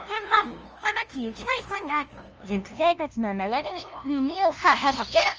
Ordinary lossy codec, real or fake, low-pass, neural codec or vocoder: Opus, 24 kbps; fake; 7.2 kHz; codec, 16 kHz, 0.5 kbps, FunCodec, trained on LibriTTS, 25 frames a second